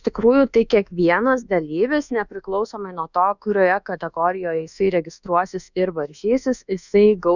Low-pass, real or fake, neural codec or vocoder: 7.2 kHz; fake; codec, 24 kHz, 1.2 kbps, DualCodec